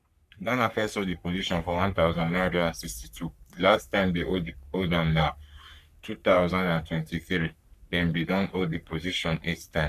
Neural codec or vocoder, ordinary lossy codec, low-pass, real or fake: codec, 44.1 kHz, 3.4 kbps, Pupu-Codec; none; 14.4 kHz; fake